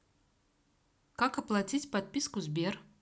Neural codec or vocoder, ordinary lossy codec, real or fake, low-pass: none; none; real; none